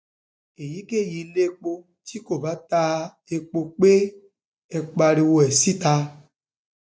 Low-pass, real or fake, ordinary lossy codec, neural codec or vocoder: none; real; none; none